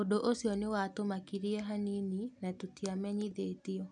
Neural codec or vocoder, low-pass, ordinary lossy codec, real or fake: none; 9.9 kHz; none; real